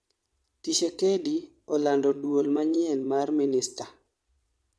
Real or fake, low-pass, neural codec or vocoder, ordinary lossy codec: fake; none; vocoder, 22.05 kHz, 80 mel bands, Vocos; none